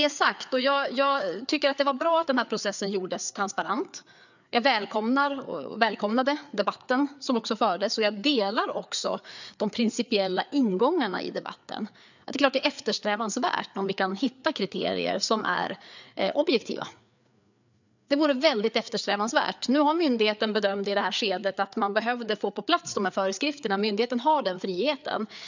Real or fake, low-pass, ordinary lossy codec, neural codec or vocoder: fake; 7.2 kHz; none; codec, 16 kHz, 4 kbps, FreqCodec, larger model